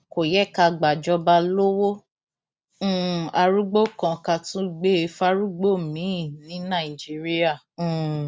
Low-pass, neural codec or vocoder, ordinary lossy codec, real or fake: none; none; none; real